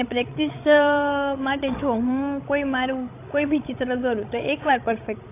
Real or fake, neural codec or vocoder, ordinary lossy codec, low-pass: fake; codec, 16 kHz, 16 kbps, FreqCodec, larger model; AAC, 24 kbps; 3.6 kHz